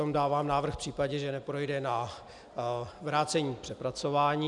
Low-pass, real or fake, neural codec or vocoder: 10.8 kHz; real; none